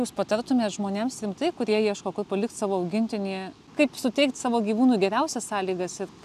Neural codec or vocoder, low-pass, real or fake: none; 14.4 kHz; real